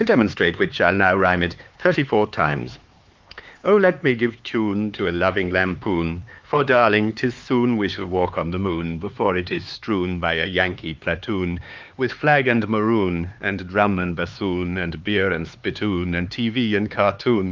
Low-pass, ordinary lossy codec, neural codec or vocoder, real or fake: 7.2 kHz; Opus, 24 kbps; codec, 16 kHz, 4 kbps, X-Codec, HuBERT features, trained on LibriSpeech; fake